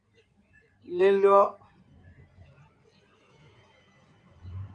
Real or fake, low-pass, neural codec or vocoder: fake; 9.9 kHz; codec, 16 kHz in and 24 kHz out, 2.2 kbps, FireRedTTS-2 codec